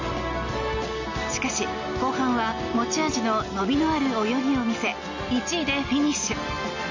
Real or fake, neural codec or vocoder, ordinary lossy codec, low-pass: real; none; none; 7.2 kHz